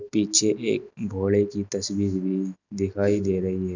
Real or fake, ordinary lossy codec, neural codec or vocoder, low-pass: real; none; none; 7.2 kHz